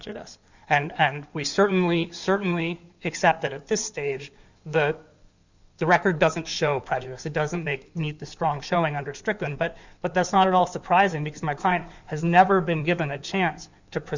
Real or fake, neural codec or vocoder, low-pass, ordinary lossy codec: fake; codec, 16 kHz in and 24 kHz out, 2.2 kbps, FireRedTTS-2 codec; 7.2 kHz; Opus, 64 kbps